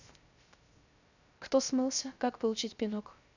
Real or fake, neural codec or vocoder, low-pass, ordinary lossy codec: fake; codec, 16 kHz, 0.3 kbps, FocalCodec; 7.2 kHz; none